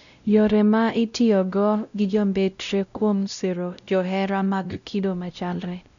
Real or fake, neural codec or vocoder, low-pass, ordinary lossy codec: fake; codec, 16 kHz, 0.5 kbps, X-Codec, WavLM features, trained on Multilingual LibriSpeech; 7.2 kHz; none